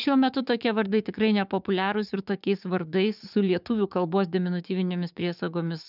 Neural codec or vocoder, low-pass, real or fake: codec, 16 kHz, 6 kbps, DAC; 5.4 kHz; fake